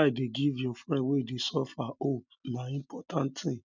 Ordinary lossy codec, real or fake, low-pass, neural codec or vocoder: none; real; 7.2 kHz; none